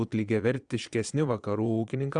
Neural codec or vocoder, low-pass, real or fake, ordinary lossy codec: vocoder, 22.05 kHz, 80 mel bands, Vocos; 9.9 kHz; fake; Opus, 64 kbps